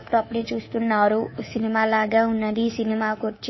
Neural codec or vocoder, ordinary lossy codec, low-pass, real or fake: codec, 24 kHz, 3.1 kbps, DualCodec; MP3, 24 kbps; 7.2 kHz; fake